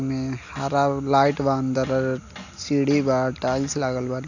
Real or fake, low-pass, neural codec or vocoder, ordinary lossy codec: real; 7.2 kHz; none; none